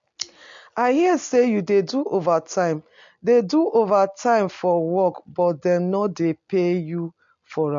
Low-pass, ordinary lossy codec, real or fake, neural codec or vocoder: 7.2 kHz; MP3, 48 kbps; real; none